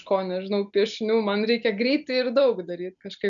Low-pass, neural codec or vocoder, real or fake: 7.2 kHz; none; real